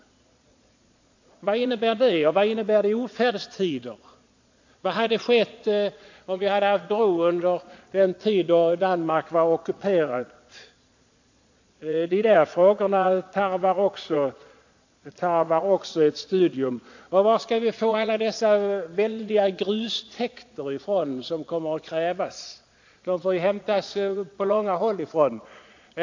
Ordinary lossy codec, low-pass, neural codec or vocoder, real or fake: AAC, 48 kbps; 7.2 kHz; vocoder, 22.05 kHz, 80 mel bands, Vocos; fake